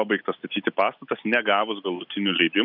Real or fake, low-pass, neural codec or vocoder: real; 5.4 kHz; none